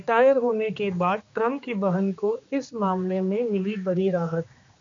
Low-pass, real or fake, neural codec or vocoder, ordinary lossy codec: 7.2 kHz; fake; codec, 16 kHz, 2 kbps, X-Codec, HuBERT features, trained on general audio; MP3, 64 kbps